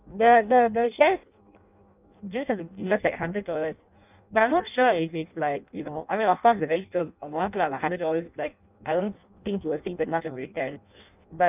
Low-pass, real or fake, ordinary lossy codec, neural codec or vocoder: 3.6 kHz; fake; none; codec, 16 kHz in and 24 kHz out, 0.6 kbps, FireRedTTS-2 codec